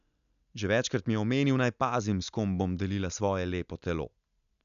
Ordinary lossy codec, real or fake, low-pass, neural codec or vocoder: MP3, 96 kbps; real; 7.2 kHz; none